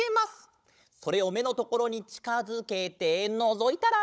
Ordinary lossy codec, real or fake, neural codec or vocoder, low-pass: none; fake; codec, 16 kHz, 16 kbps, FunCodec, trained on Chinese and English, 50 frames a second; none